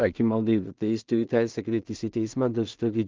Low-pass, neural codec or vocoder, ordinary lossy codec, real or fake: 7.2 kHz; codec, 16 kHz in and 24 kHz out, 0.4 kbps, LongCat-Audio-Codec, two codebook decoder; Opus, 24 kbps; fake